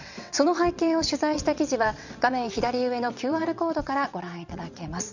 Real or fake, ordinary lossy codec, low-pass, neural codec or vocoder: fake; none; 7.2 kHz; vocoder, 22.05 kHz, 80 mel bands, WaveNeXt